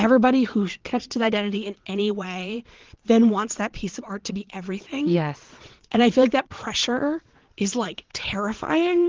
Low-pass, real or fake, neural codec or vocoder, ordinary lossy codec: 7.2 kHz; fake; vocoder, 22.05 kHz, 80 mel bands, Vocos; Opus, 16 kbps